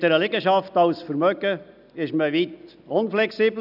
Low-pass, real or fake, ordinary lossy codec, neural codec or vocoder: 5.4 kHz; real; none; none